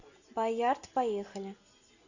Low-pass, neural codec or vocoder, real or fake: 7.2 kHz; none; real